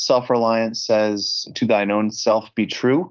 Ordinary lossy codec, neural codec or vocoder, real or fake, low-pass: Opus, 32 kbps; codec, 24 kHz, 3.1 kbps, DualCodec; fake; 7.2 kHz